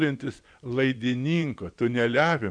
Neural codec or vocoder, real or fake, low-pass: vocoder, 24 kHz, 100 mel bands, Vocos; fake; 9.9 kHz